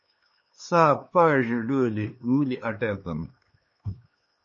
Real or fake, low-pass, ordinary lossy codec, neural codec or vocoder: fake; 7.2 kHz; MP3, 32 kbps; codec, 16 kHz, 2 kbps, X-Codec, HuBERT features, trained on LibriSpeech